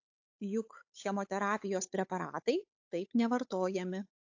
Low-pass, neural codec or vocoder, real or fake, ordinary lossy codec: 7.2 kHz; codec, 16 kHz, 8 kbps, FunCodec, trained on Chinese and English, 25 frames a second; fake; AAC, 48 kbps